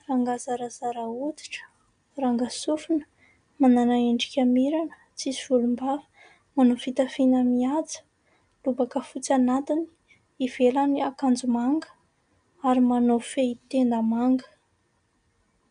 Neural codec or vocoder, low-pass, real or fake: none; 9.9 kHz; real